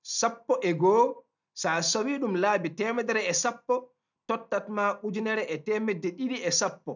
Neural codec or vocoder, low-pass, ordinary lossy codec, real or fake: none; 7.2 kHz; none; real